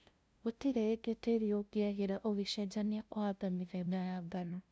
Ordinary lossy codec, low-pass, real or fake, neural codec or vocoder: none; none; fake; codec, 16 kHz, 0.5 kbps, FunCodec, trained on LibriTTS, 25 frames a second